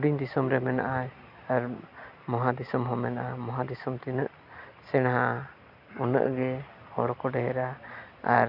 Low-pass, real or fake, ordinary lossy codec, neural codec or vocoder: 5.4 kHz; real; none; none